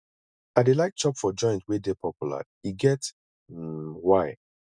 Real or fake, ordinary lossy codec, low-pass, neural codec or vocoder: real; none; 9.9 kHz; none